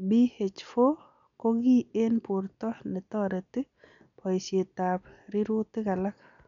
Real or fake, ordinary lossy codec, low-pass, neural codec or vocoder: real; none; 7.2 kHz; none